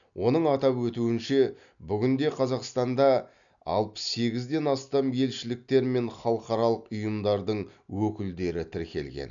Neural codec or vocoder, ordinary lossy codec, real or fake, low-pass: none; none; real; 7.2 kHz